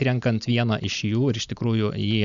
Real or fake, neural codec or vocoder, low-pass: real; none; 7.2 kHz